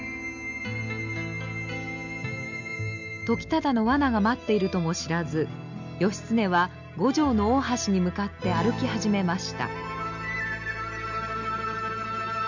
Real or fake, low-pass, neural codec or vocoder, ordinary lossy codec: real; 7.2 kHz; none; none